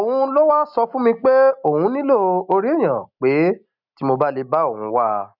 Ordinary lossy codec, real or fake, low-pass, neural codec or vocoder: none; real; 5.4 kHz; none